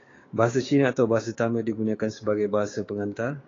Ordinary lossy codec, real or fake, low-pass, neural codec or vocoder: AAC, 32 kbps; fake; 7.2 kHz; codec, 16 kHz, 6 kbps, DAC